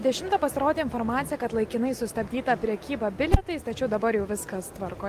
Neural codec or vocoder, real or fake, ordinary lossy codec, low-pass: none; real; Opus, 24 kbps; 14.4 kHz